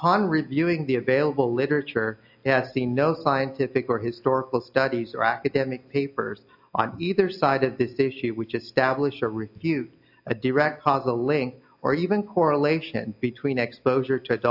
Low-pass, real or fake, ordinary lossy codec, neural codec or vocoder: 5.4 kHz; real; AAC, 48 kbps; none